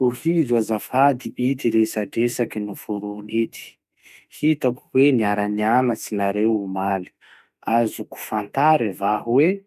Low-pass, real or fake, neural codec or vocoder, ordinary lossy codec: 14.4 kHz; fake; autoencoder, 48 kHz, 32 numbers a frame, DAC-VAE, trained on Japanese speech; none